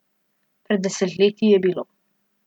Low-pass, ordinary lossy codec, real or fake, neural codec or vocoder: 19.8 kHz; none; real; none